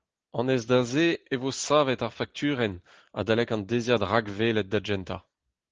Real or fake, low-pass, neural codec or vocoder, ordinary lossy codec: real; 7.2 kHz; none; Opus, 16 kbps